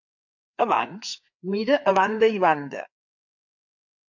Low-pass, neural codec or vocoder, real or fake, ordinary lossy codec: 7.2 kHz; codec, 16 kHz, 4 kbps, FreqCodec, larger model; fake; MP3, 64 kbps